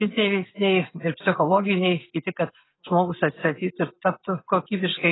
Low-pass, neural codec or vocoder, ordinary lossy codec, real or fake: 7.2 kHz; none; AAC, 16 kbps; real